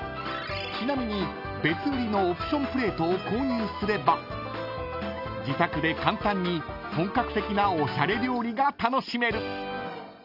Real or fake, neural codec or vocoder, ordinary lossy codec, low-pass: real; none; none; 5.4 kHz